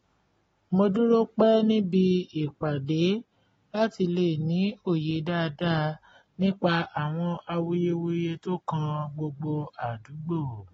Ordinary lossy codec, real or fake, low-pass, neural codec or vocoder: AAC, 24 kbps; real; 14.4 kHz; none